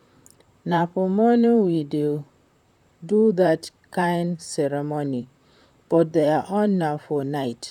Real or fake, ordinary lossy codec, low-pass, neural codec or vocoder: fake; none; 19.8 kHz; vocoder, 44.1 kHz, 128 mel bands, Pupu-Vocoder